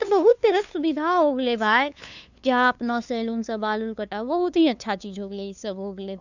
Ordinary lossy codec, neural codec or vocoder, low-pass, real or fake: none; codec, 16 kHz, 2 kbps, FunCodec, trained on LibriTTS, 25 frames a second; 7.2 kHz; fake